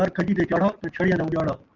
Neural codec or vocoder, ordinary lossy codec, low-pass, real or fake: none; Opus, 24 kbps; 7.2 kHz; real